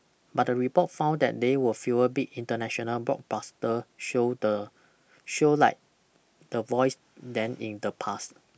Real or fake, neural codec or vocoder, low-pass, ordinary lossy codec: real; none; none; none